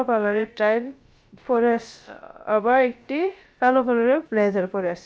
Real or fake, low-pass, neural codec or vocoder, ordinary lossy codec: fake; none; codec, 16 kHz, about 1 kbps, DyCAST, with the encoder's durations; none